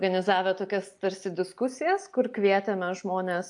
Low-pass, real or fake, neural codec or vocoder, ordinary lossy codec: 10.8 kHz; real; none; AAC, 64 kbps